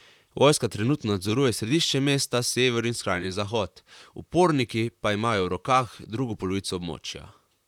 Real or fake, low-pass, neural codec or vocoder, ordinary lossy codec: fake; 19.8 kHz; vocoder, 44.1 kHz, 128 mel bands, Pupu-Vocoder; none